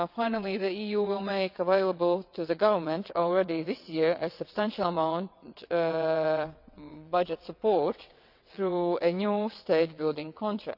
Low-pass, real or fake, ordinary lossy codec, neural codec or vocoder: 5.4 kHz; fake; none; vocoder, 22.05 kHz, 80 mel bands, WaveNeXt